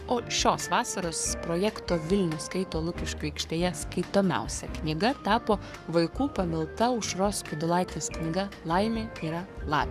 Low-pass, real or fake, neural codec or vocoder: 14.4 kHz; fake; codec, 44.1 kHz, 7.8 kbps, Pupu-Codec